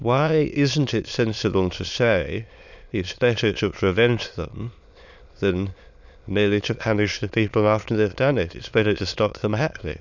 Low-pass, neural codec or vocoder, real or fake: 7.2 kHz; autoencoder, 22.05 kHz, a latent of 192 numbers a frame, VITS, trained on many speakers; fake